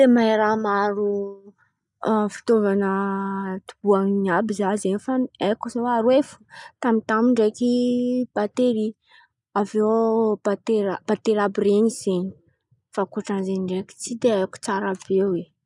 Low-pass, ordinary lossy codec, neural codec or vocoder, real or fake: 10.8 kHz; none; none; real